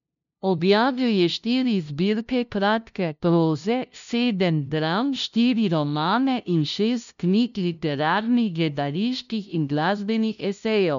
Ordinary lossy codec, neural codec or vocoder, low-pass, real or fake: none; codec, 16 kHz, 0.5 kbps, FunCodec, trained on LibriTTS, 25 frames a second; 7.2 kHz; fake